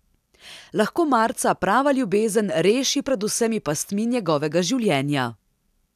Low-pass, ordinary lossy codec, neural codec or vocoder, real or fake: 14.4 kHz; none; none; real